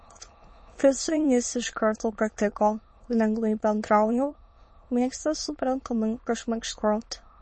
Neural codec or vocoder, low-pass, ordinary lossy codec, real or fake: autoencoder, 22.05 kHz, a latent of 192 numbers a frame, VITS, trained on many speakers; 9.9 kHz; MP3, 32 kbps; fake